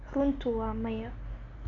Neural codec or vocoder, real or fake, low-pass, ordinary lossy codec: none; real; 7.2 kHz; none